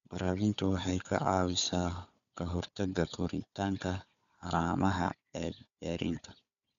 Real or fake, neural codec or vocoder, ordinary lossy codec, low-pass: fake; codec, 16 kHz, 4 kbps, FunCodec, trained on Chinese and English, 50 frames a second; none; 7.2 kHz